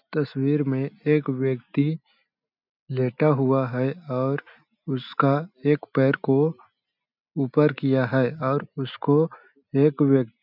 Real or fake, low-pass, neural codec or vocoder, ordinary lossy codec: real; 5.4 kHz; none; none